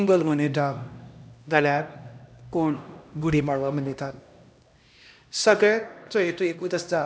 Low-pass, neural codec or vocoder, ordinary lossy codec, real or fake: none; codec, 16 kHz, 1 kbps, X-Codec, HuBERT features, trained on LibriSpeech; none; fake